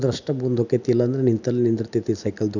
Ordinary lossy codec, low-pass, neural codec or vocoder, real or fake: none; 7.2 kHz; none; real